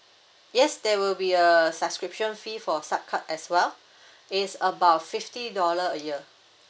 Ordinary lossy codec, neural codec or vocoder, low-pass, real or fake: none; none; none; real